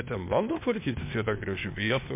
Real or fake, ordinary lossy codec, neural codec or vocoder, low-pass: fake; MP3, 32 kbps; codec, 16 kHz, 0.8 kbps, ZipCodec; 3.6 kHz